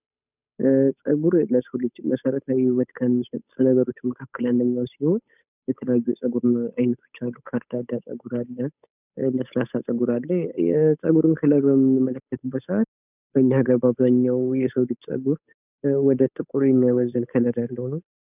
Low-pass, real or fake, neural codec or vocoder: 3.6 kHz; fake; codec, 16 kHz, 8 kbps, FunCodec, trained on Chinese and English, 25 frames a second